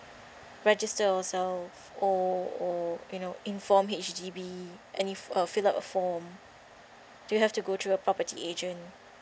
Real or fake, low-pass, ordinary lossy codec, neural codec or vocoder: real; none; none; none